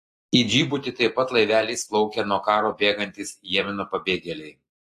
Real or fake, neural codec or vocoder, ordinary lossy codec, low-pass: real; none; AAC, 48 kbps; 14.4 kHz